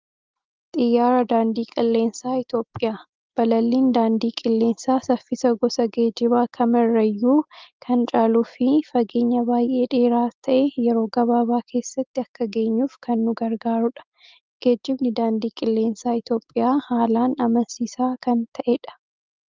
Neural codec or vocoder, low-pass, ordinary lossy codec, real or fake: none; 7.2 kHz; Opus, 32 kbps; real